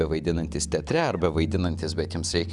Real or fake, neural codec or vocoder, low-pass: real; none; 10.8 kHz